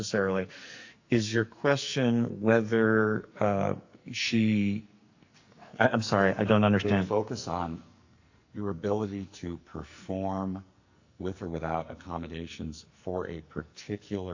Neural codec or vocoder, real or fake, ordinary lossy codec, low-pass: codec, 44.1 kHz, 2.6 kbps, SNAC; fake; AAC, 48 kbps; 7.2 kHz